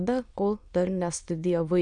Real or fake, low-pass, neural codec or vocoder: fake; 9.9 kHz; autoencoder, 22.05 kHz, a latent of 192 numbers a frame, VITS, trained on many speakers